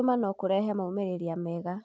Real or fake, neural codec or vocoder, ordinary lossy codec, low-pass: real; none; none; none